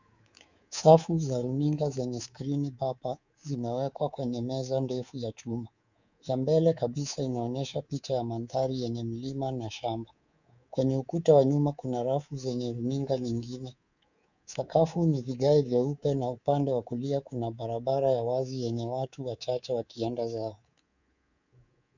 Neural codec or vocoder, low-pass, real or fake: codec, 24 kHz, 3.1 kbps, DualCodec; 7.2 kHz; fake